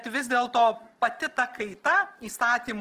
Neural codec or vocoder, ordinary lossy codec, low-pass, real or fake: none; Opus, 16 kbps; 14.4 kHz; real